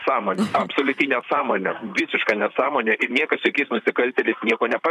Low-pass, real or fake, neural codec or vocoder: 14.4 kHz; fake; vocoder, 44.1 kHz, 128 mel bands, Pupu-Vocoder